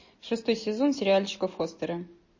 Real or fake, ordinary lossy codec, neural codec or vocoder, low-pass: real; MP3, 32 kbps; none; 7.2 kHz